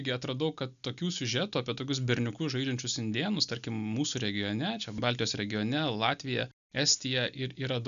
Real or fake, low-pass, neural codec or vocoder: real; 7.2 kHz; none